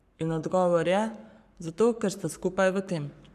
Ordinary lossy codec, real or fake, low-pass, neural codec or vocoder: none; fake; 14.4 kHz; codec, 44.1 kHz, 7.8 kbps, Pupu-Codec